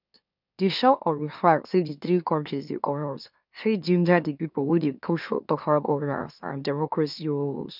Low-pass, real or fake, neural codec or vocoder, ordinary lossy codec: 5.4 kHz; fake; autoencoder, 44.1 kHz, a latent of 192 numbers a frame, MeloTTS; none